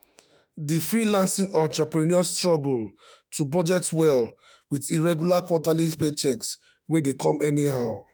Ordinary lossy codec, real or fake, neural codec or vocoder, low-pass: none; fake; autoencoder, 48 kHz, 32 numbers a frame, DAC-VAE, trained on Japanese speech; none